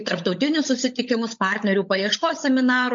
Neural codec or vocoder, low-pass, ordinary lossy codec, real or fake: codec, 16 kHz, 16 kbps, FunCodec, trained on LibriTTS, 50 frames a second; 7.2 kHz; MP3, 48 kbps; fake